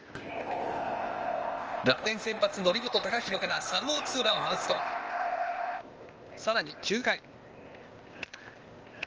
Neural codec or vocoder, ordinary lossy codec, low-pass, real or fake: codec, 16 kHz, 0.8 kbps, ZipCodec; Opus, 24 kbps; 7.2 kHz; fake